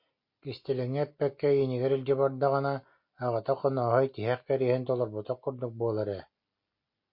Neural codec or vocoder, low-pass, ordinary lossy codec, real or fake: none; 5.4 kHz; MP3, 32 kbps; real